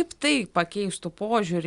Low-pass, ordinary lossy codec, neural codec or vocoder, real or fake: 10.8 kHz; Opus, 64 kbps; none; real